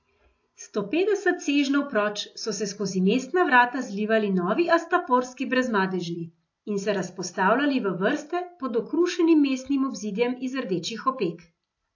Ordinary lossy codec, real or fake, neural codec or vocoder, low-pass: AAC, 48 kbps; real; none; 7.2 kHz